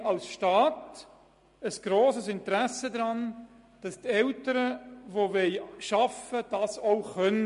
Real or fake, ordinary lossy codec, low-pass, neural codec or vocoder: real; MP3, 48 kbps; 10.8 kHz; none